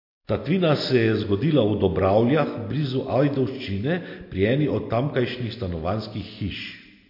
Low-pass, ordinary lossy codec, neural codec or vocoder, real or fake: 5.4 kHz; MP3, 32 kbps; none; real